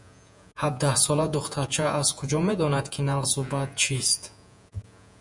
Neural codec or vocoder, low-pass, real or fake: vocoder, 48 kHz, 128 mel bands, Vocos; 10.8 kHz; fake